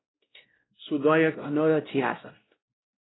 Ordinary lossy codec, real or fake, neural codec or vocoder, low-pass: AAC, 16 kbps; fake; codec, 16 kHz, 0.5 kbps, X-Codec, WavLM features, trained on Multilingual LibriSpeech; 7.2 kHz